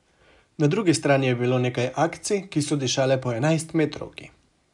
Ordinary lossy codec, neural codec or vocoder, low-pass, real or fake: none; none; 10.8 kHz; real